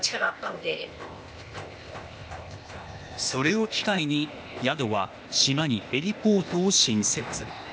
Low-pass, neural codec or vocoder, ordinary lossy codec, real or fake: none; codec, 16 kHz, 0.8 kbps, ZipCodec; none; fake